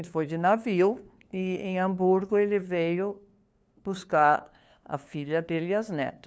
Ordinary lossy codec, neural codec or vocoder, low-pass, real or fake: none; codec, 16 kHz, 2 kbps, FunCodec, trained on LibriTTS, 25 frames a second; none; fake